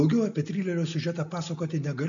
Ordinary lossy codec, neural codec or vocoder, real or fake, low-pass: AAC, 64 kbps; none; real; 7.2 kHz